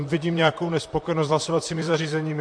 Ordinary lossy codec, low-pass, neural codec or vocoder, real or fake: MP3, 48 kbps; 9.9 kHz; vocoder, 44.1 kHz, 128 mel bands, Pupu-Vocoder; fake